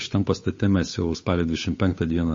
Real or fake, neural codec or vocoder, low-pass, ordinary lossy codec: fake; codec, 16 kHz, 8 kbps, FunCodec, trained on Chinese and English, 25 frames a second; 7.2 kHz; MP3, 32 kbps